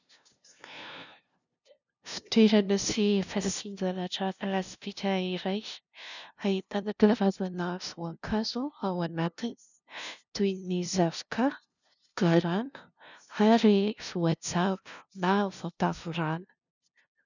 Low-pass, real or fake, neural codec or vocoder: 7.2 kHz; fake; codec, 16 kHz, 0.5 kbps, FunCodec, trained on LibriTTS, 25 frames a second